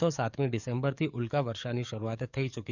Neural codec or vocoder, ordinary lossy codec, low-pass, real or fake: codec, 44.1 kHz, 7.8 kbps, DAC; none; 7.2 kHz; fake